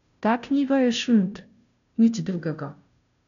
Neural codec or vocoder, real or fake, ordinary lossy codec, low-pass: codec, 16 kHz, 0.5 kbps, FunCodec, trained on Chinese and English, 25 frames a second; fake; none; 7.2 kHz